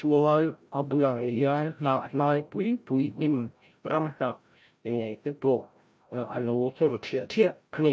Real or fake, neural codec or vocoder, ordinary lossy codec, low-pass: fake; codec, 16 kHz, 0.5 kbps, FreqCodec, larger model; none; none